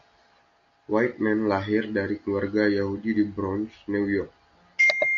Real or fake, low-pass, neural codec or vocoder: real; 7.2 kHz; none